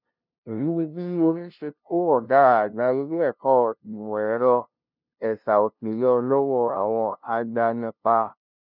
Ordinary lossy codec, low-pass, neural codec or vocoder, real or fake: none; 5.4 kHz; codec, 16 kHz, 0.5 kbps, FunCodec, trained on LibriTTS, 25 frames a second; fake